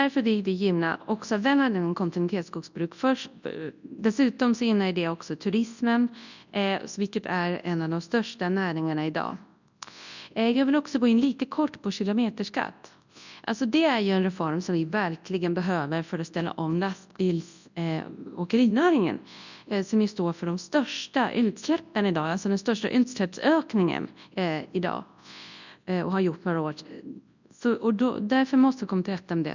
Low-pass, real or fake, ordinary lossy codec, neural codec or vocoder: 7.2 kHz; fake; none; codec, 24 kHz, 0.9 kbps, WavTokenizer, large speech release